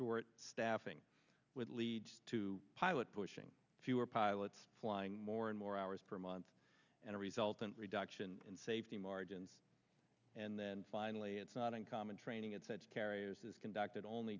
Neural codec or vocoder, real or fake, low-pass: none; real; 7.2 kHz